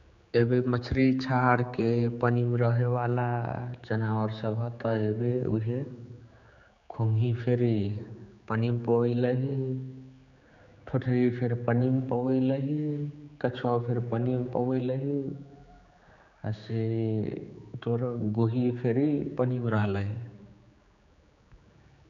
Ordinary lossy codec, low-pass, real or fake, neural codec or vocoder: none; 7.2 kHz; fake; codec, 16 kHz, 4 kbps, X-Codec, HuBERT features, trained on general audio